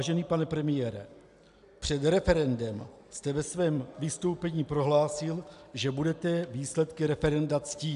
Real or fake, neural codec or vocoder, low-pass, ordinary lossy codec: real; none; 10.8 kHz; AAC, 96 kbps